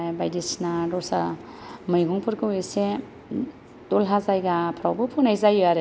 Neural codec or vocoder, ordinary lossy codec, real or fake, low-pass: none; none; real; none